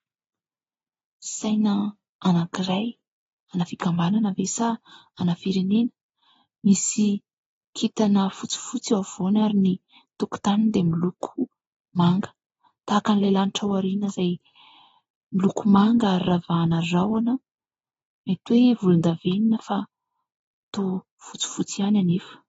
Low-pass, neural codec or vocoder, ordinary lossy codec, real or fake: 10.8 kHz; none; AAC, 24 kbps; real